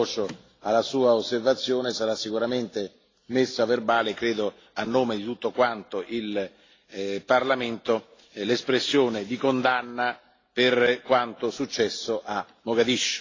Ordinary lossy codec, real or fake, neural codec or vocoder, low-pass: AAC, 32 kbps; real; none; 7.2 kHz